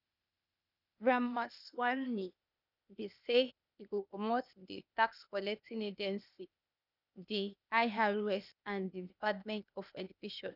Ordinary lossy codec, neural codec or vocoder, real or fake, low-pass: none; codec, 16 kHz, 0.8 kbps, ZipCodec; fake; 5.4 kHz